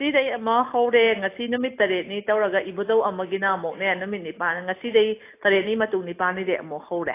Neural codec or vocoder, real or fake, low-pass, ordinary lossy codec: none; real; 3.6 kHz; AAC, 24 kbps